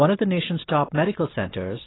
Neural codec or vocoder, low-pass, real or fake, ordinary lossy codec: none; 7.2 kHz; real; AAC, 16 kbps